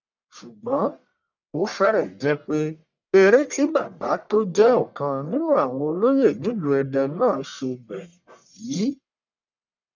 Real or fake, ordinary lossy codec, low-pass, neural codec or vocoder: fake; none; 7.2 kHz; codec, 44.1 kHz, 1.7 kbps, Pupu-Codec